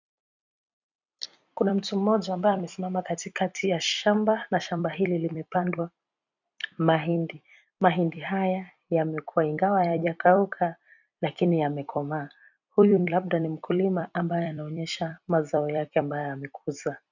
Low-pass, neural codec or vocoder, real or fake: 7.2 kHz; vocoder, 44.1 kHz, 128 mel bands every 256 samples, BigVGAN v2; fake